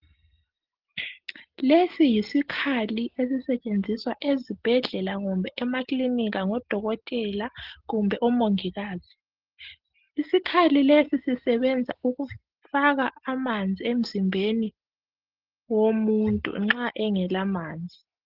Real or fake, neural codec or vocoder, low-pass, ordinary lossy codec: real; none; 5.4 kHz; Opus, 16 kbps